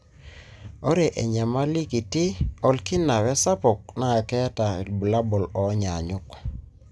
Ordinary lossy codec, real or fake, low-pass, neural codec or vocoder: none; real; none; none